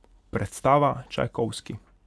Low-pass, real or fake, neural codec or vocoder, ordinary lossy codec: none; real; none; none